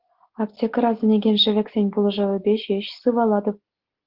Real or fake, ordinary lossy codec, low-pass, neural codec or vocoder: real; Opus, 16 kbps; 5.4 kHz; none